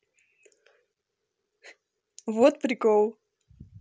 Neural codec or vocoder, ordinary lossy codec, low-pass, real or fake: none; none; none; real